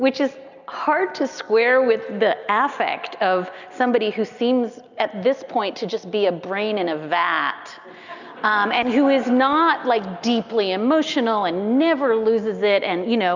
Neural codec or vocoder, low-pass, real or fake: none; 7.2 kHz; real